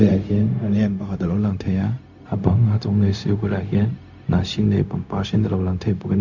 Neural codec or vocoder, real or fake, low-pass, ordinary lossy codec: codec, 16 kHz, 0.4 kbps, LongCat-Audio-Codec; fake; 7.2 kHz; none